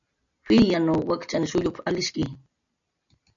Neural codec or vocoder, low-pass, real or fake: none; 7.2 kHz; real